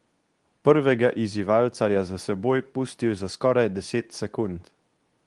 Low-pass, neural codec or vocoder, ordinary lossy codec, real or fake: 10.8 kHz; codec, 24 kHz, 0.9 kbps, WavTokenizer, medium speech release version 2; Opus, 24 kbps; fake